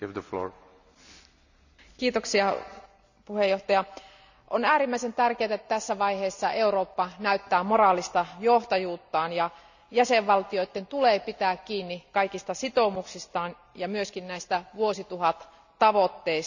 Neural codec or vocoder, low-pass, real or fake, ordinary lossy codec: none; 7.2 kHz; real; none